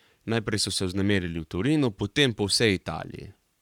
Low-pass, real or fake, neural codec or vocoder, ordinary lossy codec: 19.8 kHz; fake; codec, 44.1 kHz, 7.8 kbps, Pupu-Codec; none